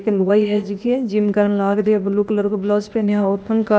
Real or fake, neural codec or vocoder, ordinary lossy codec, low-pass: fake; codec, 16 kHz, 0.8 kbps, ZipCodec; none; none